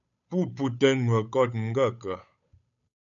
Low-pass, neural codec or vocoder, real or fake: 7.2 kHz; codec, 16 kHz, 8 kbps, FunCodec, trained on Chinese and English, 25 frames a second; fake